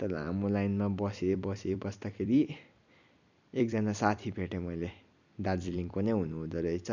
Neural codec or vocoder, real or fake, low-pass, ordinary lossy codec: vocoder, 44.1 kHz, 128 mel bands every 256 samples, BigVGAN v2; fake; 7.2 kHz; none